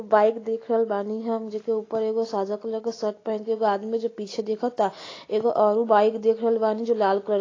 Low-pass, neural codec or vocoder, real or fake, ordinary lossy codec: 7.2 kHz; none; real; AAC, 32 kbps